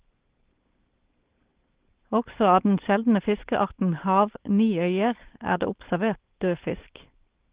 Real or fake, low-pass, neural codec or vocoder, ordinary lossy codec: fake; 3.6 kHz; codec, 16 kHz, 4.8 kbps, FACodec; Opus, 16 kbps